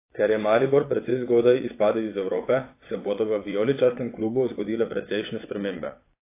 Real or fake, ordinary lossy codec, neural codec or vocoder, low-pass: fake; AAC, 24 kbps; vocoder, 22.05 kHz, 80 mel bands, WaveNeXt; 3.6 kHz